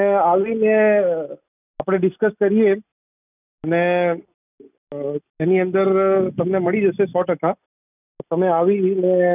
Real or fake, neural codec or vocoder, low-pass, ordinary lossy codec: real; none; 3.6 kHz; none